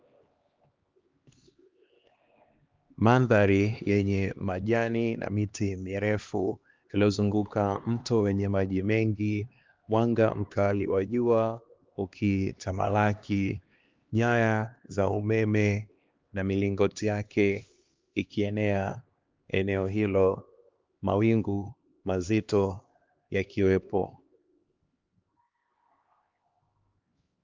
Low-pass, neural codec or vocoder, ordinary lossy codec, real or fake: 7.2 kHz; codec, 16 kHz, 2 kbps, X-Codec, HuBERT features, trained on LibriSpeech; Opus, 32 kbps; fake